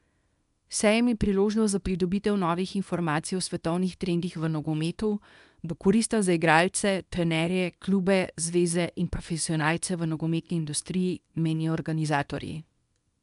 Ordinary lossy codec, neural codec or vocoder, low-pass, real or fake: none; codec, 24 kHz, 0.9 kbps, WavTokenizer, small release; 10.8 kHz; fake